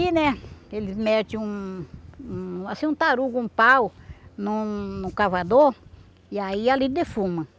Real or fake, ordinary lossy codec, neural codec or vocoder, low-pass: real; none; none; none